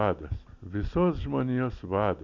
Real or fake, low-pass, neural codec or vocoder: real; 7.2 kHz; none